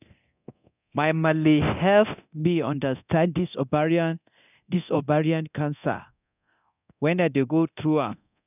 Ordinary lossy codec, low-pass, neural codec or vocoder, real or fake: none; 3.6 kHz; codec, 24 kHz, 0.9 kbps, DualCodec; fake